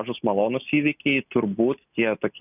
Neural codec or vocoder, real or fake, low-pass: none; real; 3.6 kHz